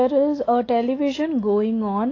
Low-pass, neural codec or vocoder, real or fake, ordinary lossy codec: 7.2 kHz; none; real; AAC, 32 kbps